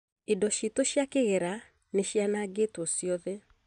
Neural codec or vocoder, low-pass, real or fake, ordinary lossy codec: none; 9.9 kHz; real; none